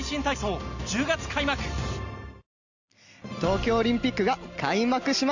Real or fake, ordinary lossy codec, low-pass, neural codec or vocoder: real; none; 7.2 kHz; none